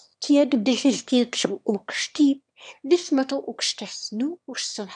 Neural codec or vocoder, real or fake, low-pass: autoencoder, 22.05 kHz, a latent of 192 numbers a frame, VITS, trained on one speaker; fake; 9.9 kHz